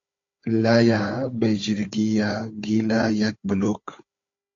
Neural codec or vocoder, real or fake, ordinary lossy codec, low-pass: codec, 16 kHz, 4 kbps, FunCodec, trained on Chinese and English, 50 frames a second; fake; AAC, 48 kbps; 7.2 kHz